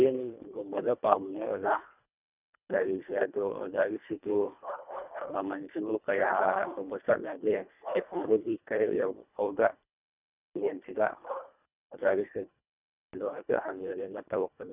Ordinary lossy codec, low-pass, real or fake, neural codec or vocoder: none; 3.6 kHz; fake; codec, 24 kHz, 1.5 kbps, HILCodec